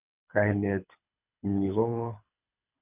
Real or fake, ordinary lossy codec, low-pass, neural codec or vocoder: fake; none; 3.6 kHz; codec, 24 kHz, 6 kbps, HILCodec